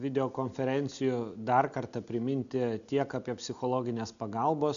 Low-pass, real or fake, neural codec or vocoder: 7.2 kHz; real; none